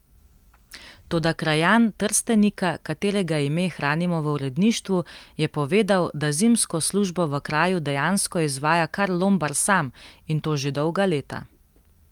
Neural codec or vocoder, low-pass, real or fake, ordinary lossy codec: none; 19.8 kHz; real; Opus, 32 kbps